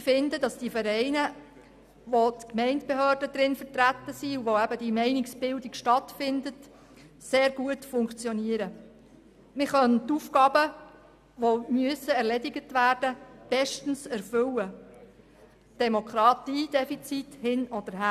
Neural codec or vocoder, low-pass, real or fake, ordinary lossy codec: none; 14.4 kHz; real; none